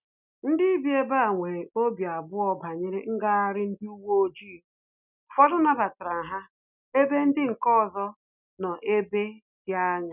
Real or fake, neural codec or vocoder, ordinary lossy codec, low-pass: real; none; none; 3.6 kHz